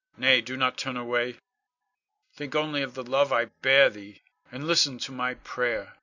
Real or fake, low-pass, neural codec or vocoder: real; 7.2 kHz; none